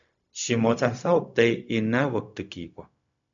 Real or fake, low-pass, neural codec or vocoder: fake; 7.2 kHz; codec, 16 kHz, 0.4 kbps, LongCat-Audio-Codec